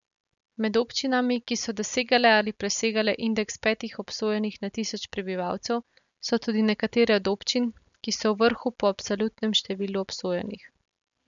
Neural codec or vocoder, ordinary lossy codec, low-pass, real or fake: none; none; 7.2 kHz; real